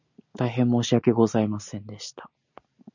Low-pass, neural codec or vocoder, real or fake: 7.2 kHz; none; real